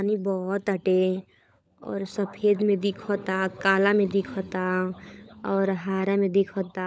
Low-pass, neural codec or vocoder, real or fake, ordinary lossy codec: none; codec, 16 kHz, 16 kbps, FunCodec, trained on LibriTTS, 50 frames a second; fake; none